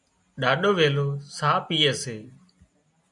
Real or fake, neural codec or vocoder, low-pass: real; none; 10.8 kHz